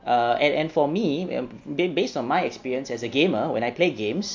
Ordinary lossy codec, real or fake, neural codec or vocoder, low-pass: MP3, 48 kbps; real; none; 7.2 kHz